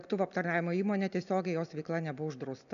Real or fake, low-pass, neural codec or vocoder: real; 7.2 kHz; none